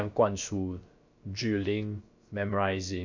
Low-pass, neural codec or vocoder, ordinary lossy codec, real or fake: 7.2 kHz; codec, 16 kHz, about 1 kbps, DyCAST, with the encoder's durations; MP3, 48 kbps; fake